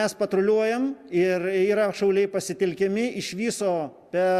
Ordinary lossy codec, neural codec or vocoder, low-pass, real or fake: Opus, 64 kbps; none; 14.4 kHz; real